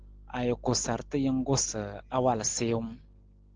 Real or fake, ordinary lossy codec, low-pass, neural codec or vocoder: real; Opus, 16 kbps; 7.2 kHz; none